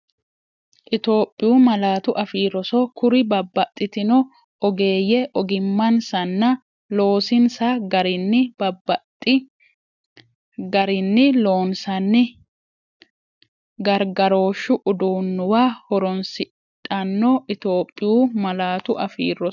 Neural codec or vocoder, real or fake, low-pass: none; real; 7.2 kHz